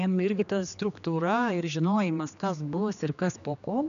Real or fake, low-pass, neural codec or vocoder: fake; 7.2 kHz; codec, 16 kHz, 2 kbps, X-Codec, HuBERT features, trained on general audio